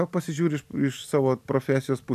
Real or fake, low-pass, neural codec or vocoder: real; 14.4 kHz; none